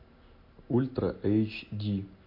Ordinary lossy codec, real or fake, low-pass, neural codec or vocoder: MP3, 32 kbps; real; 5.4 kHz; none